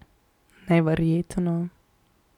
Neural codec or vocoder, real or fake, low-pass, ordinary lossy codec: none; real; 19.8 kHz; none